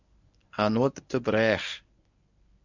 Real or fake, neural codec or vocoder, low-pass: fake; codec, 24 kHz, 0.9 kbps, WavTokenizer, medium speech release version 1; 7.2 kHz